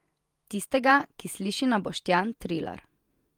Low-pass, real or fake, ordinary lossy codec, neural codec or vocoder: 19.8 kHz; fake; Opus, 32 kbps; vocoder, 48 kHz, 128 mel bands, Vocos